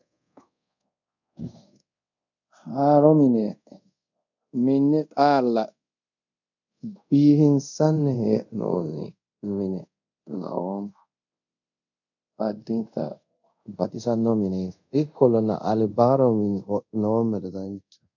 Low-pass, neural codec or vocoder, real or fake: 7.2 kHz; codec, 24 kHz, 0.5 kbps, DualCodec; fake